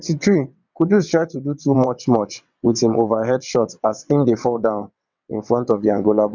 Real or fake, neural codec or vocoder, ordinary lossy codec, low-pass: fake; vocoder, 22.05 kHz, 80 mel bands, WaveNeXt; none; 7.2 kHz